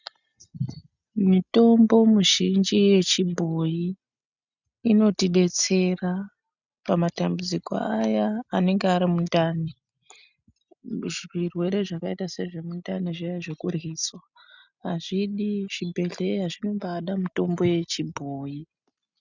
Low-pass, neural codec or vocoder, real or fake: 7.2 kHz; none; real